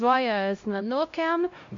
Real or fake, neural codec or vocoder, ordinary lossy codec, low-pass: fake; codec, 16 kHz, 0.5 kbps, X-Codec, HuBERT features, trained on LibriSpeech; MP3, 48 kbps; 7.2 kHz